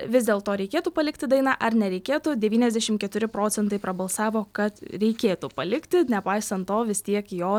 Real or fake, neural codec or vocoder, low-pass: real; none; 19.8 kHz